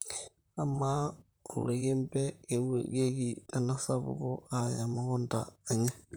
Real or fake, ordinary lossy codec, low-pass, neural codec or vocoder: fake; none; none; vocoder, 44.1 kHz, 128 mel bands, Pupu-Vocoder